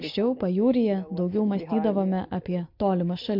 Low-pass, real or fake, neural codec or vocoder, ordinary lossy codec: 5.4 kHz; real; none; MP3, 48 kbps